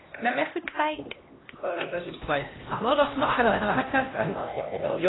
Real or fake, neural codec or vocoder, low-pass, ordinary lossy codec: fake; codec, 16 kHz, 1 kbps, X-Codec, HuBERT features, trained on LibriSpeech; 7.2 kHz; AAC, 16 kbps